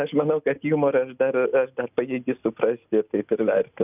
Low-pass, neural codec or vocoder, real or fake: 3.6 kHz; none; real